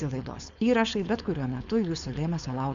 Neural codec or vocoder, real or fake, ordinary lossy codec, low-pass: codec, 16 kHz, 4.8 kbps, FACodec; fake; Opus, 64 kbps; 7.2 kHz